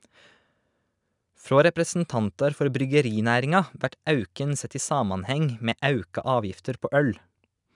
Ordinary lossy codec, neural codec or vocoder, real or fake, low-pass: none; none; real; 10.8 kHz